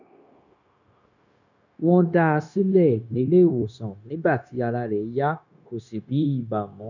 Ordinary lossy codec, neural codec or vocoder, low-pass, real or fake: none; codec, 16 kHz, 0.9 kbps, LongCat-Audio-Codec; 7.2 kHz; fake